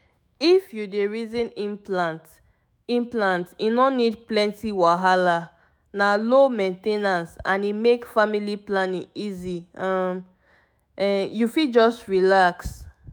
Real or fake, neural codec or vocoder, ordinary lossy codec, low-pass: fake; autoencoder, 48 kHz, 128 numbers a frame, DAC-VAE, trained on Japanese speech; none; none